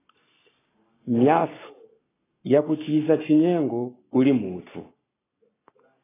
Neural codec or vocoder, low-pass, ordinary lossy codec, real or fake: codec, 44.1 kHz, 7.8 kbps, Pupu-Codec; 3.6 kHz; AAC, 16 kbps; fake